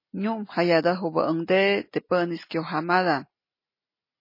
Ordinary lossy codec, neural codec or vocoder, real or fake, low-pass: MP3, 24 kbps; none; real; 5.4 kHz